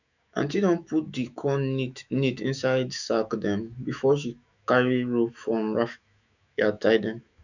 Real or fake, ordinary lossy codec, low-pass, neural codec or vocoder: fake; none; 7.2 kHz; autoencoder, 48 kHz, 128 numbers a frame, DAC-VAE, trained on Japanese speech